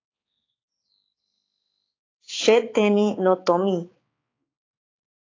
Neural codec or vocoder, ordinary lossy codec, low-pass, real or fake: codec, 16 kHz, 4 kbps, X-Codec, HuBERT features, trained on balanced general audio; AAC, 32 kbps; 7.2 kHz; fake